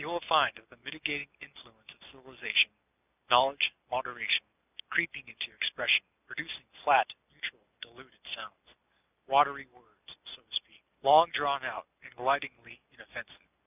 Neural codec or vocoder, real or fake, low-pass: none; real; 3.6 kHz